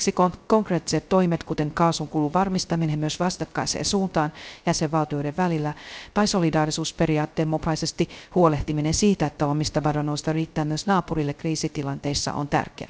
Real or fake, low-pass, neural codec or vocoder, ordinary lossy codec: fake; none; codec, 16 kHz, 0.3 kbps, FocalCodec; none